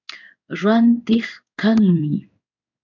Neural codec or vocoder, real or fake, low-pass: codec, 16 kHz in and 24 kHz out, 1 kbps, XY-Tokenizer; fake; 7.2 kHz